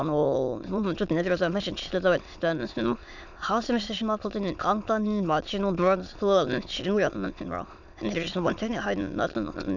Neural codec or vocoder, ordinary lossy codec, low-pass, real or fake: autoencoder, 22.05 kHz, a latent of 192 numbers a frame, VITS, trained on many speakers; none; 7.2 kHz; fake